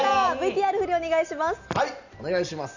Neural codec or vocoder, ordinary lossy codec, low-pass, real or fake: none; none; 7.2 kHz; real